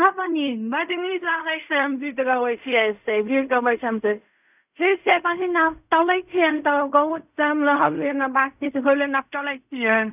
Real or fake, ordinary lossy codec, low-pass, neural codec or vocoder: fake; none; 3.6 kHz; codec, 16 kHz in and 24 kHz out, 0.4 kbps, LongCat-Audio-Codec, fine tuned four codebook decoder